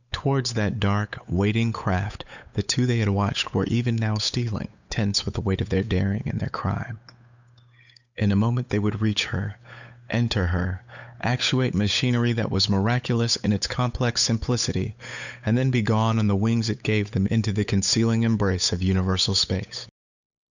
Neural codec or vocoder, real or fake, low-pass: codec, 16 kHz, 8 kbps, FunCodec, trained on Chinese and English, 25 frames a second; fake; 7.2 kHz